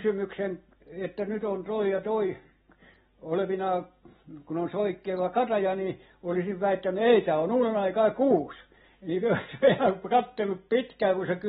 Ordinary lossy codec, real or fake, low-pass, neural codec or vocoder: AAC, 16 kbps; real; 14.4 kHz; none